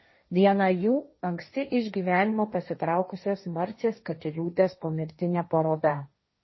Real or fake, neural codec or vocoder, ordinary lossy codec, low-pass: fake; codec, 16 kHz, 1.1 kbps, Voila-Tokenizer; MP3, 24 kbps; 7.2 kHz